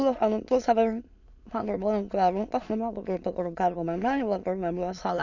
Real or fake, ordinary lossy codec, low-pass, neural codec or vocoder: fake; none; 7.2 kHz; autoencoder, 22.05 kHz, a latent of 192 numbers a frame, VITS, trained on many speakers